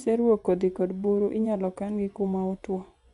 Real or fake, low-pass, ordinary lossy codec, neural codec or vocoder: fake; 10.8 kHz; none; vocoder, 24 kHz, 100 mel bands, Vocos